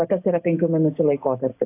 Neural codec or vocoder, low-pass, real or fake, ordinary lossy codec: none; 3.6 kHz; real; AAC, 24 kbps